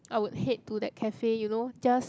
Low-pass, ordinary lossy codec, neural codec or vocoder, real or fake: none; none; none; real